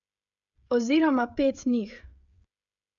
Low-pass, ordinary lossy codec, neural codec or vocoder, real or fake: 7.2 kHz; AAC, 64 kbps; codec, 16 kHz, 16 kbps, FreqCodec, smaller model; fake